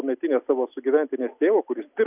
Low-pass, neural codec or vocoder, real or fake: 3.6 kHz; none; real